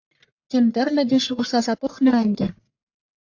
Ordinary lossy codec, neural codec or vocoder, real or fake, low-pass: AAC, 48 kbps; codec, 44.1 kHz, 1.7 kbps, Pupu-Codec; fake; 7.2 kHz